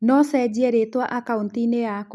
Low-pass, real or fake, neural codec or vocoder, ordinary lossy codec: none; real; none; none